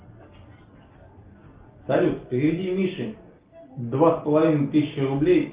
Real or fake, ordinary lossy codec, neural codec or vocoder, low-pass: real; Opus, 32 kbps; none; 3.6 kHz